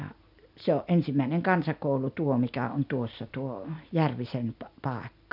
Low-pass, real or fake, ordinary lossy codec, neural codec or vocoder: 5.4 kHz; real; none; none